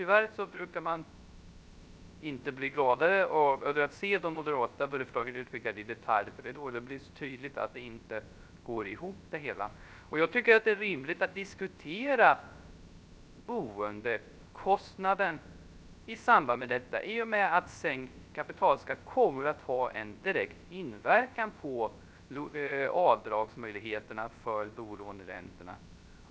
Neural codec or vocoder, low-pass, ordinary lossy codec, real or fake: codec, 16 kHz, 0.3 kbps, FocalCodec; none; none; fake